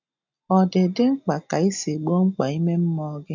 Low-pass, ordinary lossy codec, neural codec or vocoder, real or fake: 7.2 kHz; none; none; real